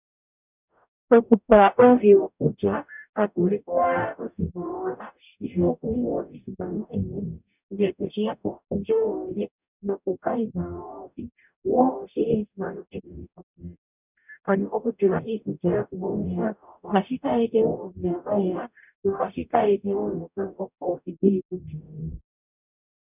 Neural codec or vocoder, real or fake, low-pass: codec, 44.1 kHz, 0.9 kbps, DAC; fake; 3.6 kHz